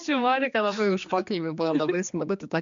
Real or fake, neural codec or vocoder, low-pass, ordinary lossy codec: fake; codec, 16 kHz, 2 kbps, X-Codec, HuBERT features, trained on general audio; 7.2 kHz; MP3, 64 kbps